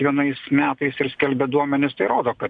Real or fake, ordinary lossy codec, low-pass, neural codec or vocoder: real; AAC, 64 kbps; 9.9 kHz; none